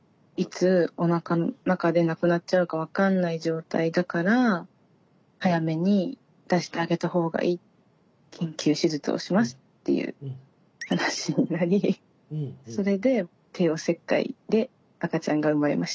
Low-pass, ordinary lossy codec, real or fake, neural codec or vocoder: none; none; real; none